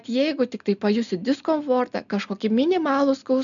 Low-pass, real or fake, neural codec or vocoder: 7.2 kHz; real; none